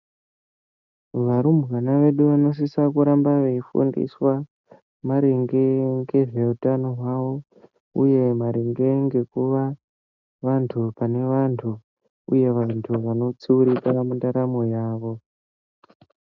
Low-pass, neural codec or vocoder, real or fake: 7.2 kHz; none; real